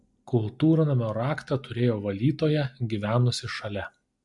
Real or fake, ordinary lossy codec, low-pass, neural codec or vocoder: real; MP3, 64 kbps; 10.8 kHz; none